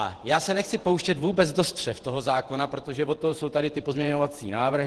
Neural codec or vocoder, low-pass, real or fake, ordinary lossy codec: vocoder, 48 kHz, 128 mel bands, Vocos; 10.8 kHz; fake; Opus, 16 kbps